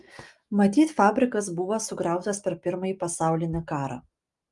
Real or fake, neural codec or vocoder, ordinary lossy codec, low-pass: real; none; Opus, 32 kbps; 10.8 kHz